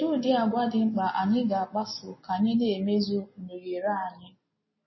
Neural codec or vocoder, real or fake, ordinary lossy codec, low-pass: none; real; MP3, 24 kbps; 7.2 kHz